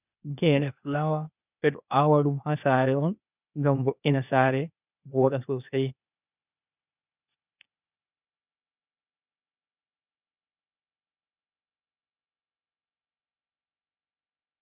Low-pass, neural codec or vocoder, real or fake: 3.6 kHz; codec, 16 kHz, 0.8 kbps, ZipCodec; fake